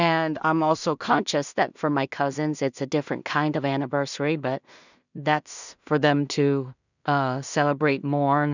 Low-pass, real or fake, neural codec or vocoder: 7.2 kHz; fake; codec, 16 kHz in and 24 kHz out, 0.4 kbps, LongCat-Audio-Codec, two codebook decoder